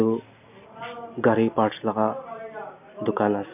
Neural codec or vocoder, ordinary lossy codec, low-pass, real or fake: none; none; 3.6 kHz; real